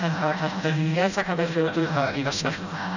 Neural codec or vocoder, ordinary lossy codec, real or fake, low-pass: codec, 16 kHz, 0.5 kbps, FreqCodec, smaller model; none; fake; 7.2 kHz